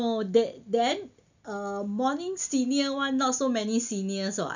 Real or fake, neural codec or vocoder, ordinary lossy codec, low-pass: real; none; none; 7.2 kHz